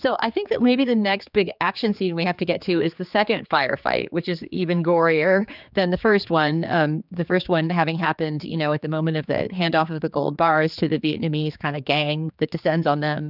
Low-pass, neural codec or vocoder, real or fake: 5.4 kHz; codec, 16 kHz, 4 kbps, X-Codec, HuBERT features, trained on general audio; fake